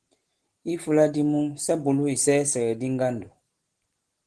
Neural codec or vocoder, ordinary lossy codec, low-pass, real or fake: none; Opus, 16 kbps; 10.8 kHz; real